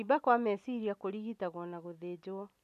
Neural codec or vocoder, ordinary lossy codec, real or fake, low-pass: none; none; real; 14.4 kHz